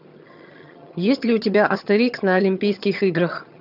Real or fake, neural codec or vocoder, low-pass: fake; vocoder, 22.05 kHz, 80 mel bands, HiFi-GAN; 5.4 kHz